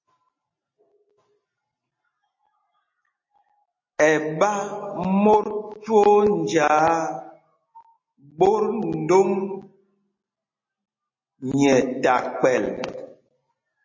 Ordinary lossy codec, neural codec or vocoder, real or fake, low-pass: MP3, 32 kbps; none; real; 7.2 kHz